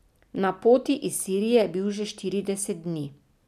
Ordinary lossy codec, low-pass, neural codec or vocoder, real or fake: none; 14.4 kHz; none; real